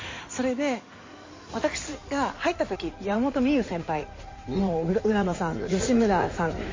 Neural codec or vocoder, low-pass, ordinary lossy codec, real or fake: codec, 16 kHz in and 24 kHz out, 2.2 kbps, FireRedTTS-2 codec; 7.2 kHz; MP3, 32 kbps; fake